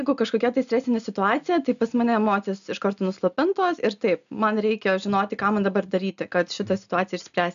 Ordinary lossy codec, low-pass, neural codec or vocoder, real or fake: AAC, 96 kbps; 7.2 kHz; none; real